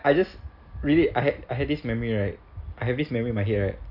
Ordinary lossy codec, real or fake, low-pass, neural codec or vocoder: none; real; 5.4 kHz; none